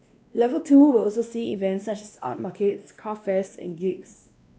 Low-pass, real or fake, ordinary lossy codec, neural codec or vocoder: none; fake; none; codec, 16 kHz, 1 kbps, X-Codec, WavLM features, trained on Multilingual LibriSpeech